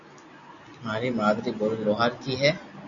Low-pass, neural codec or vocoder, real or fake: 7.2 kHz; none; real